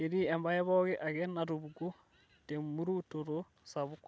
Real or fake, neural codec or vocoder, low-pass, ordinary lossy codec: real; none; none; none